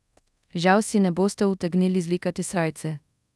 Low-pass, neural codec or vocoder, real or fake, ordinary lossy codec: none; codec, 24 kHz, 0.5 kbps, DualCodec; fake; none